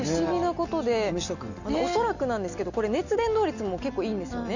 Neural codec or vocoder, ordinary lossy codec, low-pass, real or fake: none; none; 7.2 kHz; real